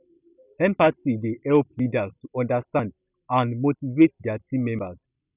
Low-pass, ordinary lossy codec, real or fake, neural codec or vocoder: 3.6 kHz; none; real; none